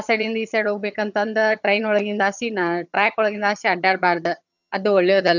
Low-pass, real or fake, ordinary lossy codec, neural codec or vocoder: 7.2 kHz; fake; none; vocoder, 22.05 kHz, 80 mel bands, HiFi-GAN